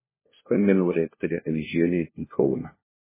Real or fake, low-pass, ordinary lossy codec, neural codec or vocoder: fake; 3.6 kHz; MP3, 16 kbps; codec, 16 kHz, 1 kbps, FunCodec, trained on LibriTTS, 50 frames a second